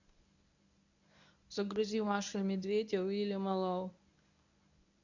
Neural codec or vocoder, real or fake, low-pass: codec, 24 kHz, 0.9 kbps, WavTokenizer, medium speech release version 1; fake; 7.2 kHz